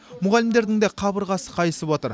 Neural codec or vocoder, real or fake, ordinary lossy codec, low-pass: none; real; none; none